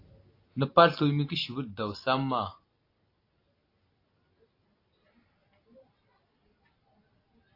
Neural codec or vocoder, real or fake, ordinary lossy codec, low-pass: none; real; AAC, 32 kbps; 5.4 kHz